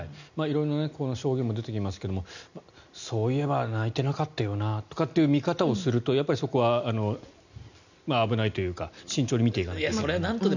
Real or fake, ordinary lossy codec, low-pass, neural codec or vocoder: real; none; 7.2 kHz; none